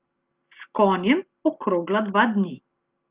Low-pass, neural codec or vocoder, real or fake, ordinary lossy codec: 3.6 kHz; none; real; Opus, 24 kbps